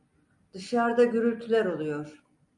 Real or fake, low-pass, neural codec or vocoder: real; 10.8 kHz; none